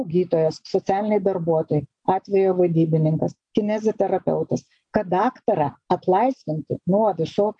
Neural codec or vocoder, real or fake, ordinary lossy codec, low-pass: none; real; AAC, 64 kbps; 9.9 kHz